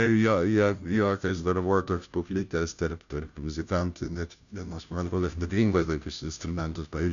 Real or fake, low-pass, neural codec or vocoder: fake; 7.2 kHz; codec, 16 kHz, 0.5 kbps, FunCodec, trained on Chinese and English, 25 frames a second